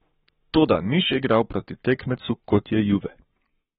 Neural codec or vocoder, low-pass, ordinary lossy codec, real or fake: codec, 44.1 kHz, 7.8 kbps, Pupu-Codec; 19.8 kHz; AAC, 16 kbps; fake